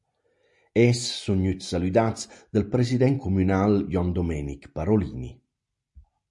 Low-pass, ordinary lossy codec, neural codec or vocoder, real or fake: 10.8 kHz; MP3, 48 kbps; none; real